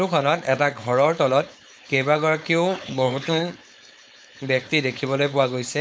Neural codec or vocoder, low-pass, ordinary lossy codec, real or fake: codec, 16 kHz, 4.8 kbps, FACodec; none; none; fake